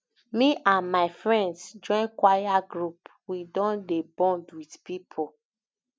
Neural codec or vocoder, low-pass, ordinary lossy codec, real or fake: none; none; none; real